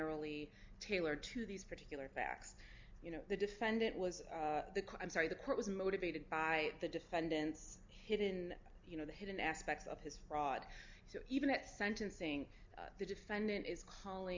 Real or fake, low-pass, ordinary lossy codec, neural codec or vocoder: real; 7.2 kHz; MP3, 64 kbps; none